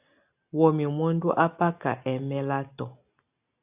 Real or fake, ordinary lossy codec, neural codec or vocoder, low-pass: real; AAC, 32 kbps; none; 3.6 kHz